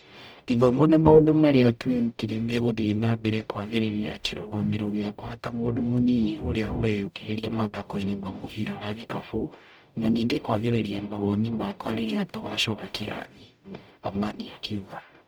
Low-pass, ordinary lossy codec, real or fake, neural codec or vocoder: none; none; fake; codec, 44.1 kHz, 0.9 kbps, DAC